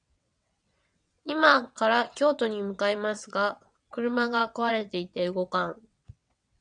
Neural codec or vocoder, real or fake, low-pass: vocoder, 22.05 kHz, 80 mel bands, WaveNeXt; fake; 9.9 kHz